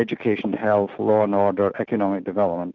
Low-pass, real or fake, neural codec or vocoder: 7.2 kHz; real; none